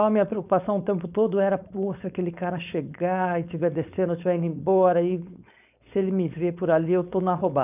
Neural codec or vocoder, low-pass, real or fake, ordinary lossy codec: codec, 16 kHz, 4.8 kbps, FACodec; 3.6 kHz; fake; none